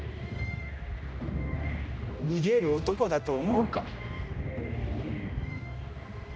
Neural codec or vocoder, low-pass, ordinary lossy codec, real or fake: codec, 16 kHz, 1 kbps, X-Codec, HuBERT features, trained on general audio; none; none; fake